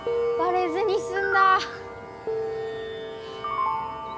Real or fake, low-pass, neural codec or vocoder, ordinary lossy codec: real; none; none; none